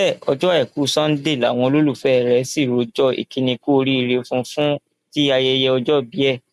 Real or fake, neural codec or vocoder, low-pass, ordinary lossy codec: real; none; 14.4 kHz; MP3, 96 kbps